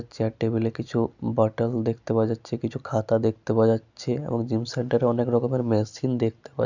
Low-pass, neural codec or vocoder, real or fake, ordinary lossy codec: 7.2 kHz; none; real; none